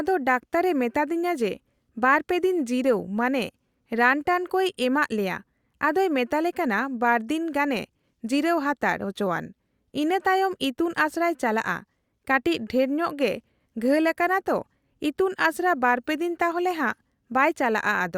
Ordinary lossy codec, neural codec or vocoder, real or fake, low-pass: Opus, 64 kbps; none; real; 19.8 kHz